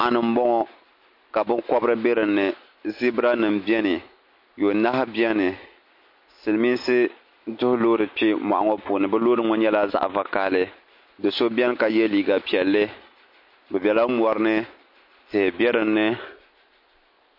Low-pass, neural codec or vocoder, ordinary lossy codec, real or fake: 5.4 kHz; none; MP3, 32 kbps; real